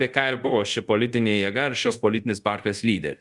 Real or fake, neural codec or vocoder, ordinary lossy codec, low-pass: fake; codec, 24 kHz, 0.5 kbps, DualCodec; Opus, 64 kbps; 10.8 kHz